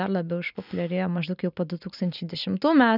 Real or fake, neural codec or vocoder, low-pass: real; none; 5.4 kHz